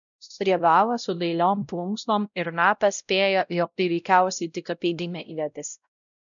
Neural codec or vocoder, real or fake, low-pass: codec, 16 kHz, 0.5 kbps, X-Codec, WavLM features, trained on Multilingual LibriSpeech; fake; 7.2 kHz